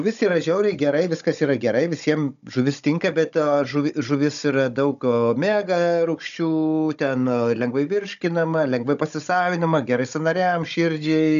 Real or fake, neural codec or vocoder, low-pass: fake; codec, 16 kHz, 16 kbps, FunCodec, trained on Chinese and English, 50 frames a second; 7.2 kHz